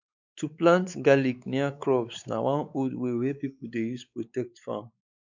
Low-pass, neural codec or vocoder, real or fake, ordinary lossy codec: 7.2 kHz; codec, 16 kHz, 4 kbps, X-Codec, WavLM features, trained on Multilingual LibriSpeech; fake; none